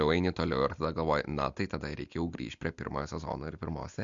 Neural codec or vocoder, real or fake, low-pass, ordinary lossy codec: none; real; 7.2 kHz; MP3, 64 kbps